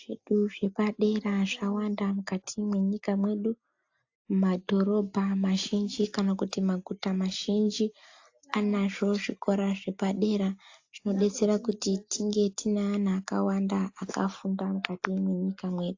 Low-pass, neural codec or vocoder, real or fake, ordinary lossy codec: 7.2 kHz; none; real; AAC, 48 kbps